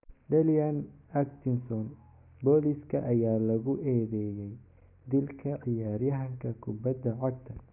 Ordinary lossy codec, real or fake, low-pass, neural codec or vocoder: none; real; 3.6 kHz; none